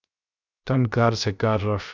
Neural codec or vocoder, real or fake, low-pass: codec, 16 kHz, 0.3 kbps, FocalCodec; fake; 7.2 kHz